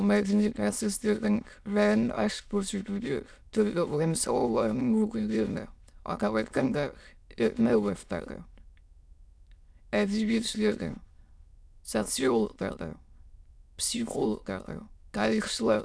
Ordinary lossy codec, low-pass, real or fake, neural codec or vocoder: none; none; fake; autoencoder, 22.05 kHz, a latent of 192 numbers a frame, VITS, trained on many speakers